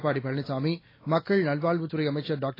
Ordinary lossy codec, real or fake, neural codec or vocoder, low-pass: AAC, 24 kbps; real; none; 5.4 kHz